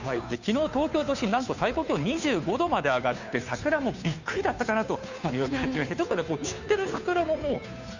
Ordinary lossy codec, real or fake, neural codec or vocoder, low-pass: none; fake; codec, 16 kHz, 2 kbps, FunCodec, trained on Chinese and English, 25 frames a second; 7.2 kHz